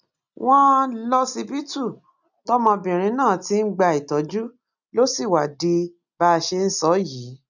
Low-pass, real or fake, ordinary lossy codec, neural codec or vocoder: 7.2 kHz; real; none; none